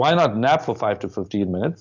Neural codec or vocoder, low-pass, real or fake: none; 7.2 kHz; real